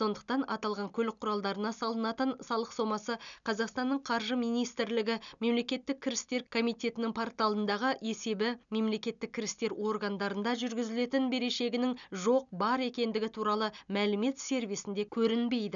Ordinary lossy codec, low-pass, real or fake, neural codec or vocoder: none; 7.2 kHz; real; none